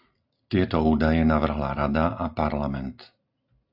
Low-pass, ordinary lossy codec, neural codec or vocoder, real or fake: 5.4 kHz; AAC, 48 kbps; none; real